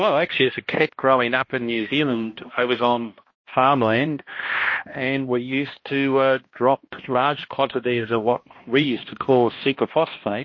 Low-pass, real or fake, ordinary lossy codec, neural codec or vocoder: 7.2 kHz; fake; MP3, 32 kbps; codec, 16 kHz, 1 kbps, X-Codec, HuBERT features, trained on balanced general audio